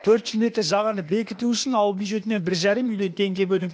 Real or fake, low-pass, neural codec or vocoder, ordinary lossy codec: fake; none; codec, 16 kHz, 0.8 kbps, ZipCodec; none